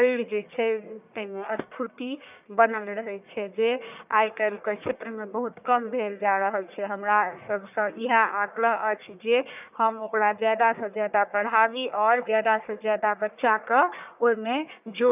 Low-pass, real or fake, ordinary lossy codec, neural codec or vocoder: 3.6 kHz; fake; none; codec, 44.1 kHz, 1.7 kbps, Pupu-Codec